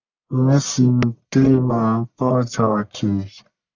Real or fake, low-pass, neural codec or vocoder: fake; 7.2 kHz; codec, 44.1 kHz, 1.7 kbps, Pupu-Codec